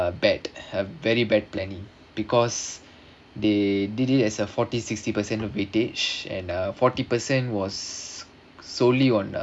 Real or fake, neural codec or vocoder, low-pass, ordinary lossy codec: real; none; none; none